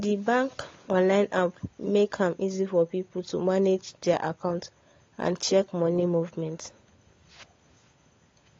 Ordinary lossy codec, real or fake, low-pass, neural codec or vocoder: AAC, 32 kbps; fake; 7.2 kHz; codec, 16 kHz, 16 kbps, FunCodec, trained on LibriTTS, 50 frames a second